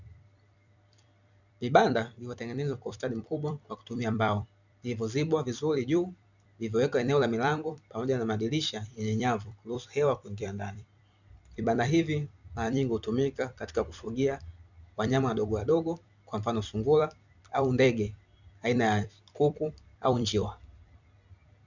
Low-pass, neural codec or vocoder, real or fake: 7.2 kHz; vocoder, 44.1 kHz, 128 mel bands every 256 samples, BigVGAN v2; fake